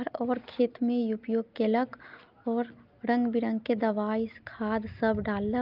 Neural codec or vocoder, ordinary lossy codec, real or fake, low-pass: none; Opus, 24 kbps; real; 5.4 kHz